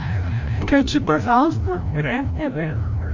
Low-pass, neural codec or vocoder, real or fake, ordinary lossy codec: 7.2 kHz; codec, 16 kHz, 0.5 kbps, FreqCodec, larger model; fake; MP3, 48 kbps